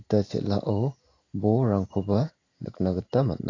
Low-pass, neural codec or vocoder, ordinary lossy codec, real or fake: 7.2 kHz; none; AAC, 32 kbps; real